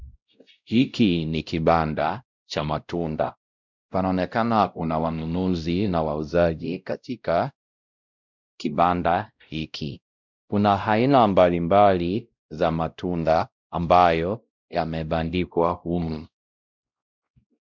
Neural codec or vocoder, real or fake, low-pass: codec, 16 kHz, 0.5 kbps, X-Codec, WavLM features, trained on Multilingual LibriSpeech; fake; 7.2 kHz